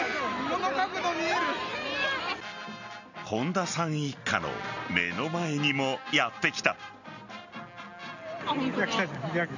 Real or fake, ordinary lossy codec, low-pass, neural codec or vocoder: real; none; 7.2 kHz; none